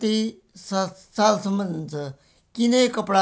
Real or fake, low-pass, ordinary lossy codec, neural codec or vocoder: real; none; none; none